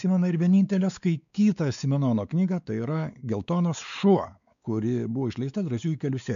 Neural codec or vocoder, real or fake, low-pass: codec, 16 kHz, 4 kbps, X-Codec, WavLM features, trained on Multilingual LibriSpeech; fake; 7.2 kHz